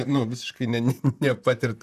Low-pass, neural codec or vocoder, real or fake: 14.4 kHz; vocoder, 44.1 kHz, 128 mel bands, Pupu-Vocoder; fake